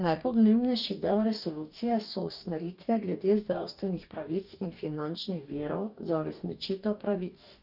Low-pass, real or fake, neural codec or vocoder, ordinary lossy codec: 5.4 kHz; fake; codec, 44.1 kHz, 2.6 kbps, DAC; none